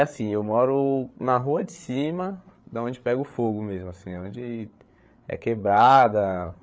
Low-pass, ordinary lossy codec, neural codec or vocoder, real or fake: none; none; codec, 16 kHz, 8 kbps, FreqCodec, larger model; fake